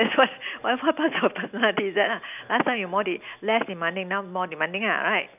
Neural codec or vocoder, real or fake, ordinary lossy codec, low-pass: none; real; none; 3.6 kHz